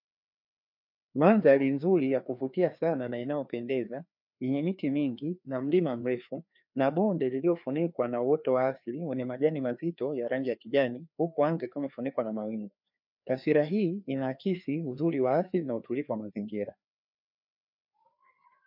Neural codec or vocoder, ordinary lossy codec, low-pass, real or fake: codec, 16 kHz, 2 kbps, FreqCodec, larger model; MP3, 48 kbps; 5.4 kHz; fake